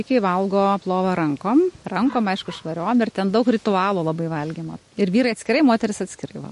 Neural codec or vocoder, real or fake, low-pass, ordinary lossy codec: none; real; 14.4 kHz; MP3, 48 kbps